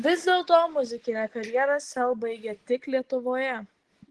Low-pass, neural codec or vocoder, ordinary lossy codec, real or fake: 10.8 kHz; none; Opus, 16 kbps; real